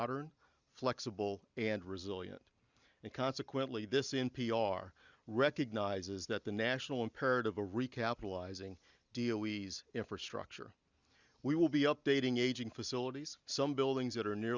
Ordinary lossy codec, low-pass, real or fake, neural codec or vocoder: Opus, 64 kbps; 7.2 kHz; real; none